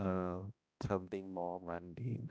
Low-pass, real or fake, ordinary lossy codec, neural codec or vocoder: none; fake; none; codec, 16 kHz, 1 kbps, X-Codec, HuBERT features, trained on balanced general audio